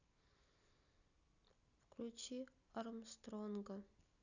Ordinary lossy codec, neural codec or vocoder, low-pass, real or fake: none; none; 7.2 kHz; real